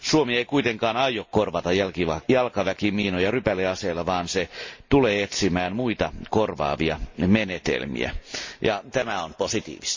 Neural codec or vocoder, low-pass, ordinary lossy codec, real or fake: none; 7.2 kHz; MP3, 32 kbps; real